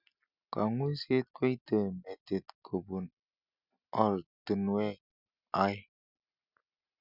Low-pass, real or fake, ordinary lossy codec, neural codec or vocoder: 5.4 kHz; real; none; none